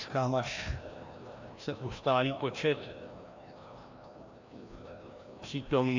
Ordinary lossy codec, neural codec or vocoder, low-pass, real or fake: AAC, 48 kbps; codec, 16 kHz, 1 kbps, FreqCodec, larger model; 7.2 kHz; fake